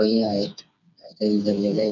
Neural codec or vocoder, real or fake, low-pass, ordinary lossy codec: codec, 16 kHz, 4 kbps, FreqCodec, smaller model; fake; 7.2 kHz; none